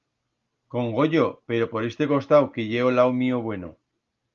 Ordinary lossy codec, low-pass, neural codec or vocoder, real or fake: Opus, 32 kbps; 7.2 kHz; none; real